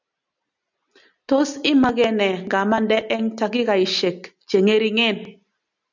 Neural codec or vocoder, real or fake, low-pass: none; real; 7.2 kHz